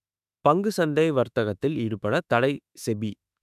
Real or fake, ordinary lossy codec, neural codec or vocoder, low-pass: fake; none; autoencoder, 48 kHz, 32 numbers a frame, DAC-VAE, trained on Japanese speech; 14.4 kHz